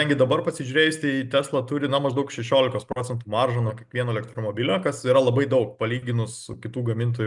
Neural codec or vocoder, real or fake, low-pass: none; real; 10.8 kHz